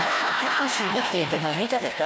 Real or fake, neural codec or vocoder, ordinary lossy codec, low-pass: fake; codec, 16 kHz, 1 kbps, FunCodec, trained on Chinese and English, 50 frames a second; none; none